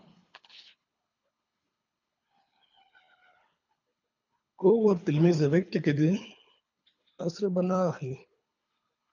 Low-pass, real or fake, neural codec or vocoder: 7.2 kHz; fake; codec, 24 kHz, 3 kbps, HILCodec